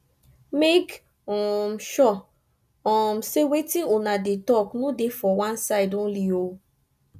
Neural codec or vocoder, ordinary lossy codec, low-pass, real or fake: none; none; 14.4 kHz; real